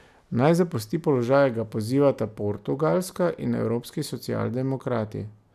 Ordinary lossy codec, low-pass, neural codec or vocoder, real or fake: none; 14.4 kHz; none; real